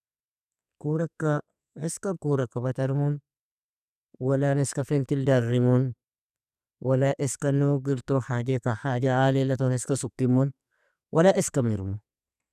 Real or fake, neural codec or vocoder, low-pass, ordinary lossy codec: fake; codec, 44.1 kHz, 2.6 kbps, SNAC; 14.4 kHz; none